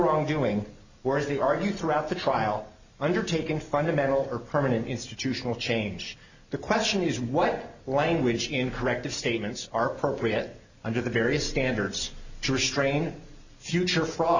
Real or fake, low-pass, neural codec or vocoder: real; 7.2 kHz; none